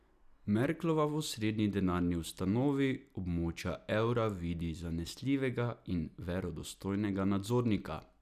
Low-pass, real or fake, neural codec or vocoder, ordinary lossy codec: 14.4 kHz; real; none; none